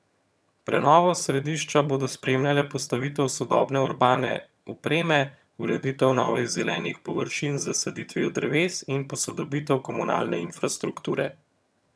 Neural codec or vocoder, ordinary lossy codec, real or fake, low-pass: vocoder, 22.05 kHz, 80 mel bands, HiFi-GAN; none; fake; none